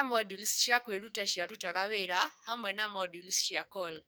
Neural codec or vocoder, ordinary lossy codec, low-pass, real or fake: codec, 44.1 kHz, 2.6 kbps, SNAC; none; none; fake